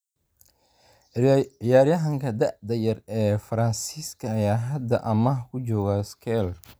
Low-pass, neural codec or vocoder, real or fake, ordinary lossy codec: none; none; real; none